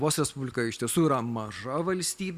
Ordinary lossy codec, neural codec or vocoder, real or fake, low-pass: Opus, 64 kbps; none; real; 14.4 kHz